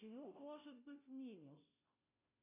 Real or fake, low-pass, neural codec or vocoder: fake; 3.6 kHz; codec, 16 kHz, 0.5 kbps, FunCodec, trained on Chinese and English, 25 frames a second